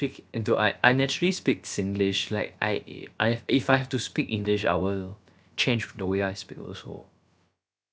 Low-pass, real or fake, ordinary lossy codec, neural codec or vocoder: none; fake; none; codec, 16 kHz, about 1 kbps, DyCAST, with the encoder's durations